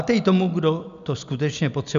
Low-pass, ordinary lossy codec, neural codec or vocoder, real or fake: 7.2 kHz; MP3, 64 kbps; none; real